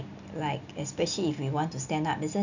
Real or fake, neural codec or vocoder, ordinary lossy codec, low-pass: real; none; none; 7.2 kHz